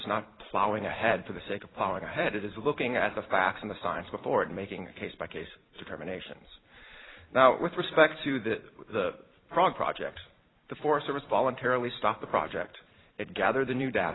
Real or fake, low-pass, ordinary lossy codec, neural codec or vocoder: fake; 7.2 kHz; AAC, 16 kbps; vocoder, 44.1 kHz, 128 mel bands every 256 samples, BigVGAN v2